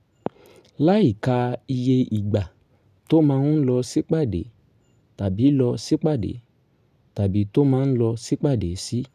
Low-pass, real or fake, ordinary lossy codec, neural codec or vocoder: 14.4 kHz; real; none; none